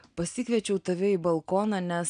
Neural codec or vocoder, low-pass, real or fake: none; 9.9 kHz; real